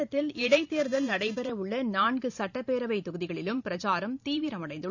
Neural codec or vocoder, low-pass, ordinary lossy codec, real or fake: vocoder, 44.1 kHz, 128 mel bands every 512 samples, BigVGAN v2; 7.2 kHz; none; fake